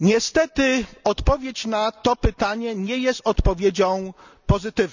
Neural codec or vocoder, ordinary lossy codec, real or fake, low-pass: none; none; real; 7.2 kHz